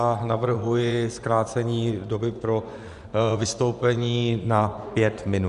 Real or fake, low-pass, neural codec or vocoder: fake; 10.8 kHz; vocoder, 24 kHz, 100 mel bands, Vocos